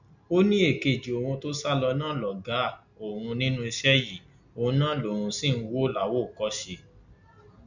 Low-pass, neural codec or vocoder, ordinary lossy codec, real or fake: 7.2 kHz; none; none; real